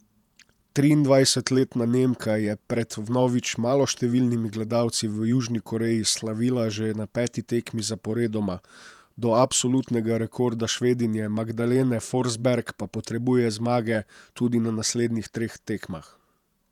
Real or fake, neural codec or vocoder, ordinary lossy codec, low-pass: fake; vocoder, 48 kHz, 128 mel bands, Vocos; none; 19.8 kHz